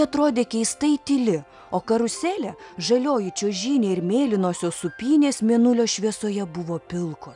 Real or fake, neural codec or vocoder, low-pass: real; none; 10.8 kHz